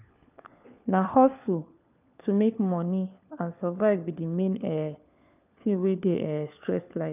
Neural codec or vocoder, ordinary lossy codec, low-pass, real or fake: codec, 16 kHz, 4 kbps, FunCodec, trained on LibriTTS, 50 frames a second; none; 3.6 kHz; fake